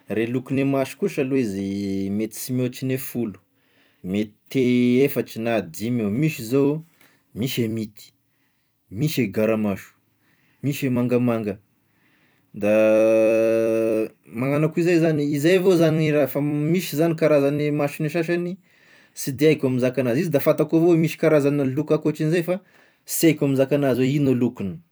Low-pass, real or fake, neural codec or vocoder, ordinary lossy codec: none; fake; vocoder, 44.1 kHz, 128 mel bands every 256 samples, BigVGAN v2; none